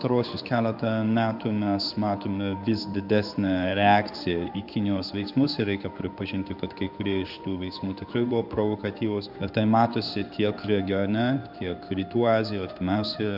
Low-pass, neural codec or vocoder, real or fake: 5.4 kHz; codec, 16 kHz in and 24 kHz out, 1 kbps, XY-Tokenizer; fake